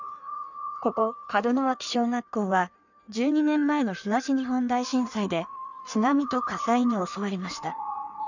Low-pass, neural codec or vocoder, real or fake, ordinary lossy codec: 7.2 kHz; codec, 16 kHz in and 24 kHz out, 1.1 kbps, FireRedTTS-2 codec; fake; none